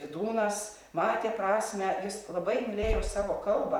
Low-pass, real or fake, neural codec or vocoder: 19.8 kHz; fake; vocoder, 44.1 kHz, 128 mel bands, Pupu-Vocoder